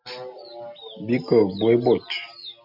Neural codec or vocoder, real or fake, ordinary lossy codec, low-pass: none; real; MP3, 48 kbps; 5.4 kHz